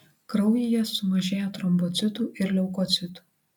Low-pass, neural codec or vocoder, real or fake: 19.8 kHz; none; real